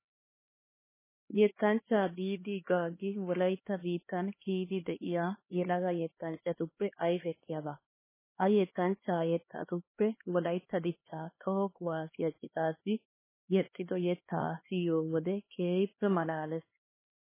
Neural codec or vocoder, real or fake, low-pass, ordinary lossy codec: codec, 16 kHz, 2 kbps, X-Codec, HuBERT features, trained on LibriSpeech; fake; 3.6 kHz; MP3, 16 kbps